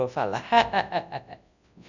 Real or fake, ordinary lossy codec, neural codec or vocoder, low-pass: fake; none; codec, 24 kHz, 0.9 kbps, WavTokenizer, large speech release; 7.2 kHz